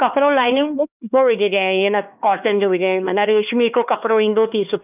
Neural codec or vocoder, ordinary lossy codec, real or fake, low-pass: codec, 16 kHz, 2 kbps, X-Codec, HuBERT features, trained on LibriSpeech; none; fake; 3.6 kHz